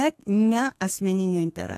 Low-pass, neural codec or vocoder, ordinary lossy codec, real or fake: 14.4 kHz; codec, 32 kHz, 1.9 kbps, SNAC; AAC, 64 kbps; fake